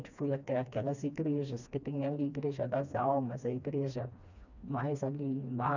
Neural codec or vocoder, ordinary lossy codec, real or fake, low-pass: codec, 16 kHz, 2 kbps, FreqCodec, smaller model; none; fake; 7.2 kHz